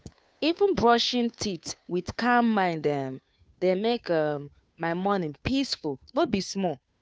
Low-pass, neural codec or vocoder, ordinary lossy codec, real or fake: none; codec, 16 kHz, 2 kbps, FunCodec, trained on Chinese and English, 25 frames a second; none; fake